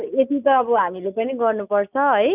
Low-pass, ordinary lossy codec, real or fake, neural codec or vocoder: 3.6 kHz; none; real; none